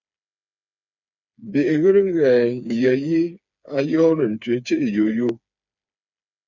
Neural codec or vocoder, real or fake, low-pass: codec, 16 kHz, 4 kbps, FreqCodec, smaller model; fake; 7.2 kHz